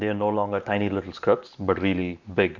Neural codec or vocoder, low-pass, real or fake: vocoder, 44.1 kHz, 128 mel bands every 512 samples, BigVGAN v2; 7.2 kHz; fake